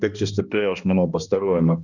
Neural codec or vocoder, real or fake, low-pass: codec, 16 kHz, 1 kbps, X-Codec, HuBERT features, trained on balanced general audio; fake; 7.2 kHz